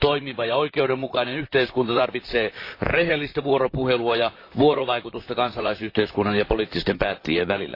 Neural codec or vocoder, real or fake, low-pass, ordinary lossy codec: vocoder, 44.1 kHz, 128 mel bands, Pupu-Vocoder; fake; 5.4 kHz; AAC, 32 kbps